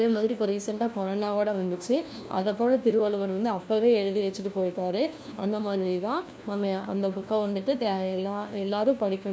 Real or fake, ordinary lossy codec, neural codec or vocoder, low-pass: fake; none; codec, 16 kHz, 1 kbps, FunCodec, trained on LibriTTS, 50 frames a second; none